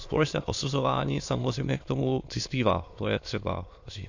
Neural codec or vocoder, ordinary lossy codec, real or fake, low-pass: autoencoder, 22.05 kHz, a latent of 192 numbers a frame, VITS, trained on many speakers; AAC, 48 kbps; fake; 7.2 kHz